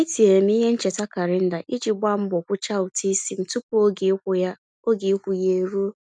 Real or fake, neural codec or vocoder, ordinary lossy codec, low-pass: real; none; none; 9.9 kHz